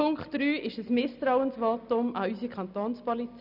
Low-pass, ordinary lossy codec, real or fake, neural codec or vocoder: 5.4 kHz; none; real; none